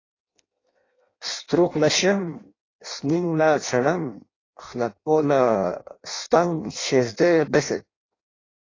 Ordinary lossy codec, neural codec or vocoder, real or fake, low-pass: AAC, 32 kbps; codec, 16 kHz in and 24 kHz out, 0.6 kbps, FireRedTTS-2 codec; fake; 7.2 kHz